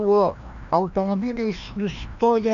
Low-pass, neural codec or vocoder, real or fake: 7.2 kHz; codec, 16 kHz, 1 kbps, FreqCodec, larger model; fake